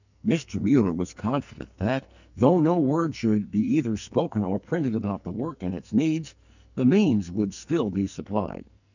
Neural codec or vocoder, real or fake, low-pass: codec, 44.1 kHz, 2.6 kbps, SNAC; fake; 7.2 kHz